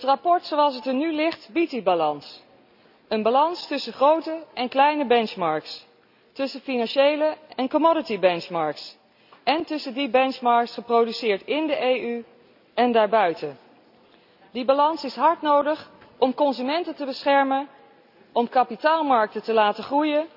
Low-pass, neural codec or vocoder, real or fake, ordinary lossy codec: 5.4 kHz; none; real; none